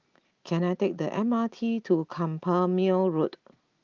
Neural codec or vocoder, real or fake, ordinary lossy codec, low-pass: none; real; Opus, 32 kbps; 7.2 kHz